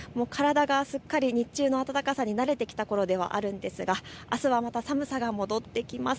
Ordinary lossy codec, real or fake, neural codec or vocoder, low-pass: none; real; none; none